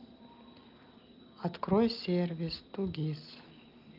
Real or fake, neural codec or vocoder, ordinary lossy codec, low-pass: real; none; Opus, 24 kbps; 5.4 kHz